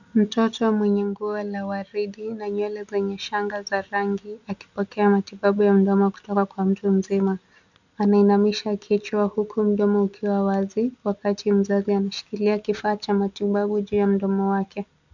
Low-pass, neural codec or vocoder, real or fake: 7.2 kHz; none; real